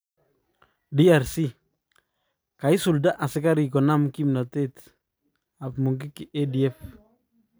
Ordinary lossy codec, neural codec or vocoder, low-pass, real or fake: none; none; none; real